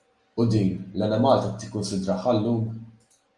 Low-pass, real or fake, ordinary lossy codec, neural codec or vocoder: 10.8 kHz; real; Opus, 32 kbps; none